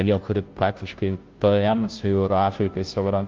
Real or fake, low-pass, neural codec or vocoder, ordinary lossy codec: fake; 7.2 kHz; codec, 16 kHz, 0.5 kbps, FunCodec, trained on Chinese and English, 25 frames a second; Opus, 32 kbps